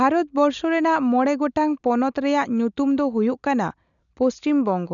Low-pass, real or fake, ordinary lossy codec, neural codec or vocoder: 7.2 kHz; real; none; none